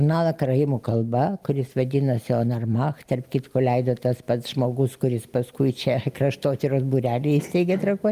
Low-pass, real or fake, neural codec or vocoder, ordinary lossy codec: 14.4 kHz; real; none; Opus, 32 kbps